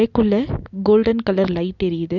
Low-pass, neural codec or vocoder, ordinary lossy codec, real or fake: 7.2 kHz; none; none; real